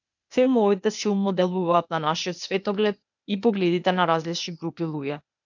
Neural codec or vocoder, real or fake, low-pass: codec, 16 kHz, 0.8 kbps, ZipCodec; fake; 7.2 kHz